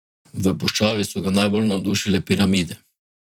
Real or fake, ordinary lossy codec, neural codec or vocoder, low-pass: fake; none; vocoder, 44.1 kHz, 128 mel bands, Pupu-Vocoder; 19.8 kHz